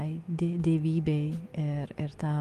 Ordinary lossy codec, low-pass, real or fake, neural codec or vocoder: Opus, 24 kbps; 14.4 kHz; real; none